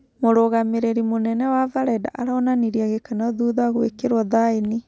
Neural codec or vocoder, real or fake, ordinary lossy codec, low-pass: none; real; none; none